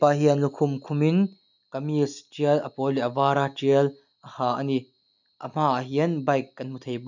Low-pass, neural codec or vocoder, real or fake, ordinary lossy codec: 7.2 kHz; none; real; none